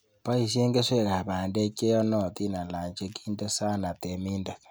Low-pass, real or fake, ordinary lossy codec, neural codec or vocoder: none; real; none; none